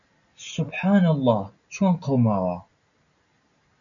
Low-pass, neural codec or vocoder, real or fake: 7.2 kHz; none; real